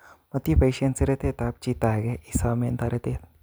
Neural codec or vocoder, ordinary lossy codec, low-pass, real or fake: none; none; none; real